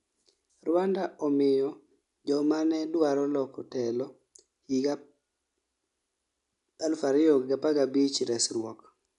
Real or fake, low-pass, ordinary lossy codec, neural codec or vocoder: real; 10.8 kHz; none; none